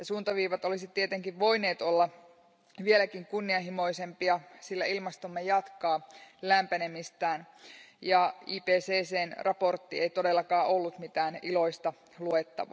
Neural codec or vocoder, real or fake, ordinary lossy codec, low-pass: none; real; none; none